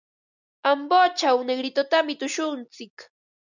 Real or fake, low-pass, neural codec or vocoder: real; 7.2 kHz; none